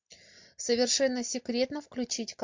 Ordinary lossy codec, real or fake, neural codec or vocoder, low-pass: MP3, 48 kbps; real; none; 7.2 kHz